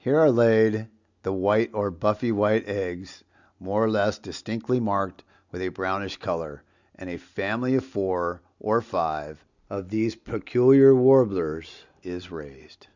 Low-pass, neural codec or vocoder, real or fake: 7.2 kHz; none; real